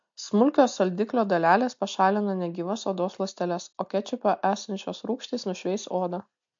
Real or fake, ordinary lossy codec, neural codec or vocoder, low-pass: real; MP3, 48 kbps; none; 7.2 kHz